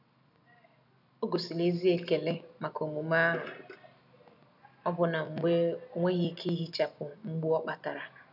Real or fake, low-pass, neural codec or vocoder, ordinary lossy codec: real; 5.4 kHz; none; none